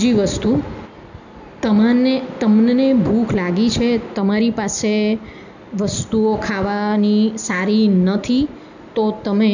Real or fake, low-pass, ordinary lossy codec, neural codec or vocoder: real; 7.2 kHz; none; none